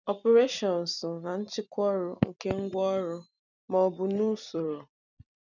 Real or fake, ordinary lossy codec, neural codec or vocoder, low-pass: fake; none; vocoder, 44.1 kHz, 128 mel bands every 256 samples, BigVGAN v2; 7.2 kHz